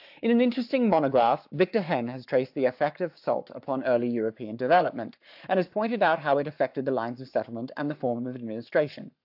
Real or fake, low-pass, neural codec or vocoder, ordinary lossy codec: fake; 5.4 kHz; codec, 44.1 kHz, 7.8 kbps, Pupu-Codec; AAC, 48 kbps